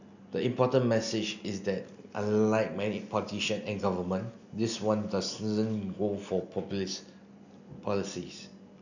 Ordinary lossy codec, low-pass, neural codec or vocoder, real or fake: none; 7.2 kHz; none; real